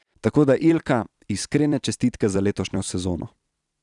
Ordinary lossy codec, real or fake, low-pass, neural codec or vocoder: none; fake; 10.8 kHz; vocoder, 44.1 kHz, 128 mel bands every 256 samples, BigVGAN v2